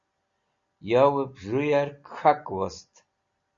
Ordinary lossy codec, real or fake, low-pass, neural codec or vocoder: Opus, 64 kbps; real; 7.2 kHz; none